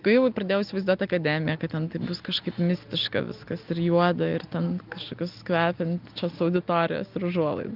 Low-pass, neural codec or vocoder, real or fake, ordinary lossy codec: 5.4 kHz; none; real; Opus, 24 kbps